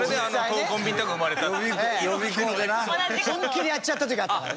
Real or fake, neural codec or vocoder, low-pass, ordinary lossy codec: real; none; none; none